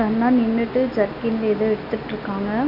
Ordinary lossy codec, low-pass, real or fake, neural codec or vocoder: none; 5.4 kHz; real; none